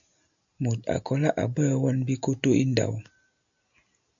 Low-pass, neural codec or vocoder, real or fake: 7.2 kHz; none; real